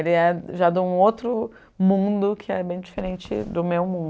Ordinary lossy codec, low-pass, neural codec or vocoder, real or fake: none; none; none; real